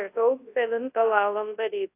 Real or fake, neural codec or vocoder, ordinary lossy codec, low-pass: fake; codec, 24 kHz, 0.9 kbps, WavTokenizer, large speech release; AAC, 24 kbps; 3.6 kHz